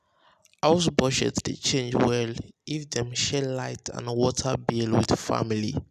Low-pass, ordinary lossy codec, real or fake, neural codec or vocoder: 14.4 kHz; none; real; none